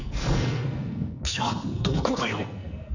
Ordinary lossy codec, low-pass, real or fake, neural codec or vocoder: none; 7.2 kHz; fake; codec, 44.1 kHz, 2.6 kbps, SNAC